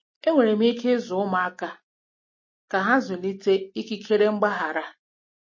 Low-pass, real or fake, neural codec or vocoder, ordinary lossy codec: 7.2 kHz; real; none; MP3, 32 kbps